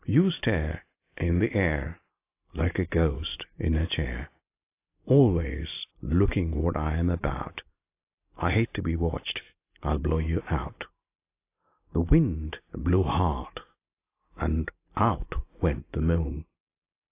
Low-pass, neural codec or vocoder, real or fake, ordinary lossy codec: 3.6 kHz; none; real; AAC, 24 kbps